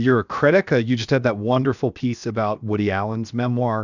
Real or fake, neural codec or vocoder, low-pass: fake; codec, 16 kHz, 0.7 kbps, FocalCodec; 7.2 kHz